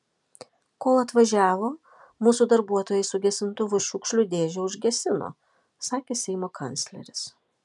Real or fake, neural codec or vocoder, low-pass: real; none; 10.8 kHz